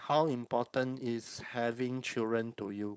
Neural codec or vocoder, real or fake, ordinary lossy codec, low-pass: codec, 16 kHz, 4.8 kbps, FACodec; fake; none; none